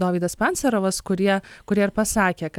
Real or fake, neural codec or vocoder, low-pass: real; none; 19.8 kHz